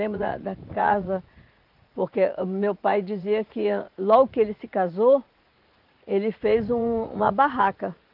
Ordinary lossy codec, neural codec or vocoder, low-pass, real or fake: Opus, 32 kbps; vocoder, 44.1 kHz, 80 mel bands, Vocos; 5.4 kHz; fake